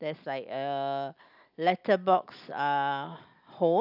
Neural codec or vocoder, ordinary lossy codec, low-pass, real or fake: none; none; 5.4 kHz; real